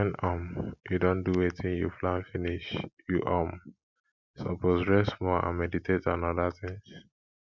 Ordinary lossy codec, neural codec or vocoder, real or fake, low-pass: none; none; real; 7.2 kHz